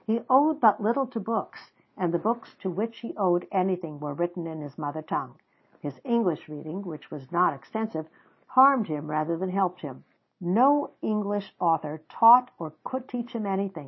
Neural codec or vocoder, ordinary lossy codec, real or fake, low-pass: none; MP3, 24 kbps; real; 7.2 kHz